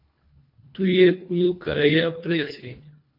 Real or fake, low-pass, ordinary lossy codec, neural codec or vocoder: fake; 5.4 kHz; MP3, 32 kbps; codec, 24 kHz, 1.5 kbps, HILCodec